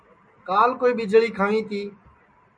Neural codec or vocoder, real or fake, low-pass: none; real; 9.9 kHz